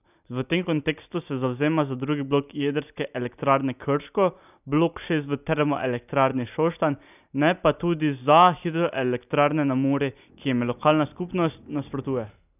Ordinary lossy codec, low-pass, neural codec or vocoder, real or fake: none; 3.6 kHz; none; real